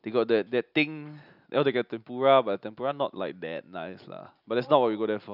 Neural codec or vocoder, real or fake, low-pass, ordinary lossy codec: none; real; 5.4 kHz; none